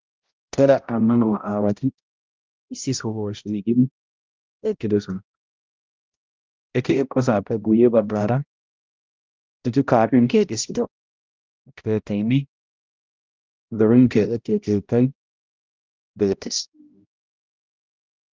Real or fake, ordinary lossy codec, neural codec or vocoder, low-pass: fake; Opus, 24 kbps; codec, 16 kHz, 0.5 kbps, X-Codec, HuBERT features, trained on balanced general audio; 7.2 kHz